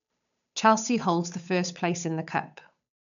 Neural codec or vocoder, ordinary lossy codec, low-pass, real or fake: codec, 16 kHz, 8 kbps, FunCodec, trained on Chinese and English, 25 frames a second; none; 7.2 kHz; fake